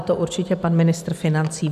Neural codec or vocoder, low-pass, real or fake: none; 14.4 kHz; real